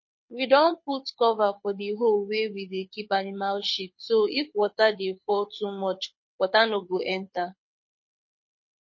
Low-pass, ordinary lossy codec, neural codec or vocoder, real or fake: 7.2 kHz; MP3, 32 kbps; codec, 24 kHz, 6 kbps, HILCodec; fake